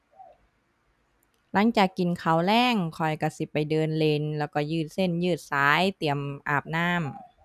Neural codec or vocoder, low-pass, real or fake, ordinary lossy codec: none; 14.4 kHz; real; none